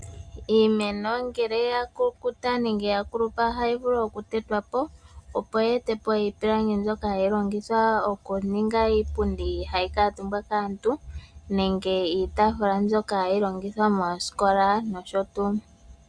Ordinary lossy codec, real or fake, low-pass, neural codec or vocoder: Opus, 64 kbps; real; 9.9 kHz; none